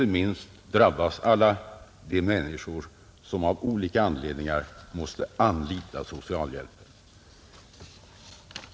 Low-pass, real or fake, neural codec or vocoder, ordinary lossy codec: none; real; none; none